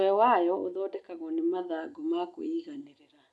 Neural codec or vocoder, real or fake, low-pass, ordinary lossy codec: none; real; none; none